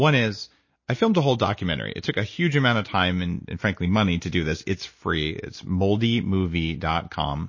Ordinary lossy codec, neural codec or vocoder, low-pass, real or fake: MP3, 32 kbps; none; 7.2 kHz; real